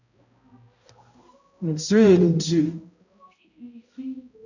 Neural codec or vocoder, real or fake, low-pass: codec, 16 kHz, 0.5 kbps, X-Codec, HuBERT features, trained on balanced general audio; fake; 7.2 kHz